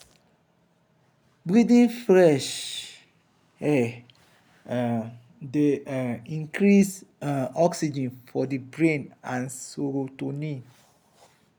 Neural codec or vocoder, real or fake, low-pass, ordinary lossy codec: none; real; none; none